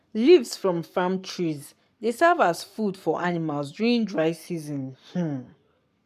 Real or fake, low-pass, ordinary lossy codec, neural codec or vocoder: fake; 14.4 kHz; none; codec, 44.1 kHz, 7.8 kbps, Pupu-Codec